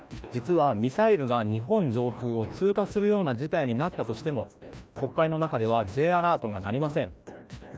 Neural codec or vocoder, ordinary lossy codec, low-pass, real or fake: codec, 16 kHz, 1 kbps, FreqCodec, larger model; none; none; fake